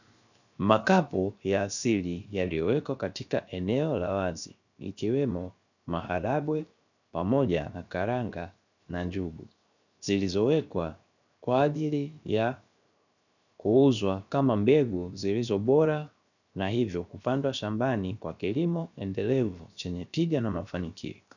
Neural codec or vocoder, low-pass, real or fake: codec, 16 kHz, 0.7 kbps, FocalCodec; 7.2 kHz; fake